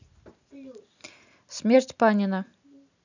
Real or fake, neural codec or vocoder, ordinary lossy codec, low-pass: real; none; none; 7.2 kHz